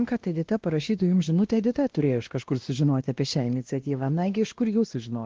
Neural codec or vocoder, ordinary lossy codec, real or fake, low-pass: codec, 16 kHz, 1 kbps, X-Codec, WavLM features, trained on Multilingual LibriSpeech; Opus, 16 kbps; fake; 7.2 kHz